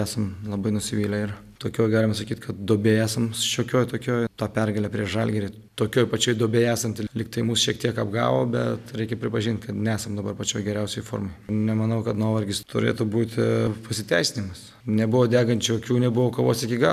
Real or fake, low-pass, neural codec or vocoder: real; 14.4 kHz; none